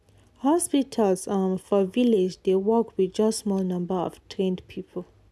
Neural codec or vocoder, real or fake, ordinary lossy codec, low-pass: none; real; none; none